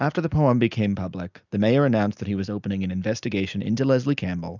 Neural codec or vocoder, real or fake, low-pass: none; real; 7.2 kHz